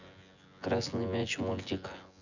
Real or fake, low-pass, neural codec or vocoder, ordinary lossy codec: fake; 7.2 kHz; vocoder, 24 kHz, 100 mel bands, Vocos; none